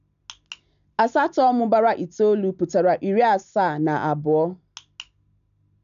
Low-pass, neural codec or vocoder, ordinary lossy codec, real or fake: 7.2 kHz; none; none; real